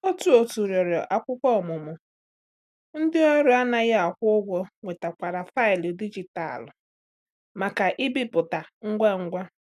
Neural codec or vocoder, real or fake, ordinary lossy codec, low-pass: none; real; none; 14.4 kHz